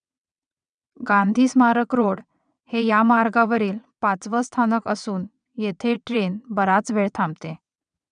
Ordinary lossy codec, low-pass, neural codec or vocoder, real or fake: none; 9.9 kHz; vocoder, 22.05 kHz, 80 mel bands, WaveNeXt; fake